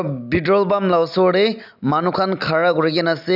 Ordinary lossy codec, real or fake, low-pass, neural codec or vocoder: none; real; 5.4 kHz; none